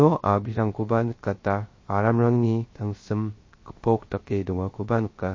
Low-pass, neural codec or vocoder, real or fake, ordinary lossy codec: 7.2 kHz; codec, 16 kHz, 0.3 kbps, FocalCodec; fake; MP3, 32 kbps